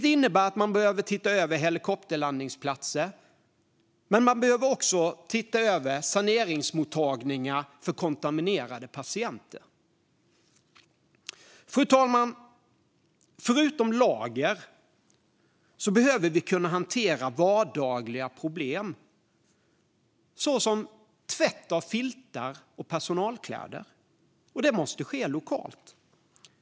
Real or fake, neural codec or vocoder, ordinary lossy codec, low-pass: real; none; none; none